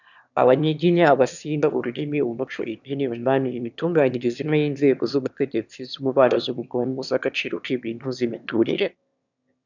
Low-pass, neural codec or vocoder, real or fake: 7.2 kHz; autoencoder, 22.05 kHz, a latent of 192 numbers a frame, VITS, trained on one speaker; fake